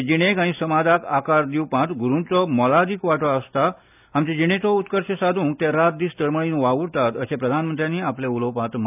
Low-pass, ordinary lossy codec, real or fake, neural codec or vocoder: 3.6 kHz; none; real; none